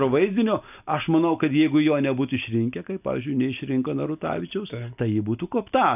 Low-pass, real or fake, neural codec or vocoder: 3.6 kHz; real; none